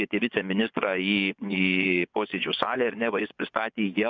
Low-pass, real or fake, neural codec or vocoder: 7.2 kHz; fake; vocoder, 24 kHz, 100 mel bands, Vocos